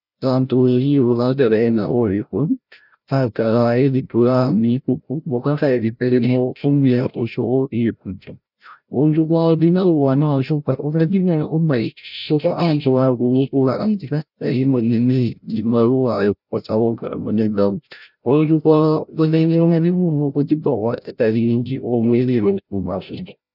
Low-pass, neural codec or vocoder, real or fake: 5.4 kHz; codec, 16 kHz, 0.5 kbps, FreqCodec, larger model; fake